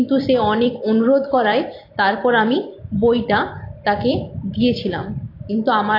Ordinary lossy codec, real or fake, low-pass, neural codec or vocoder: AAC, 32 kbps; fake; 5.4 kHz; vocoder, 44.1 kHz, 128 mel bands every 256 samples, BigVGAN v2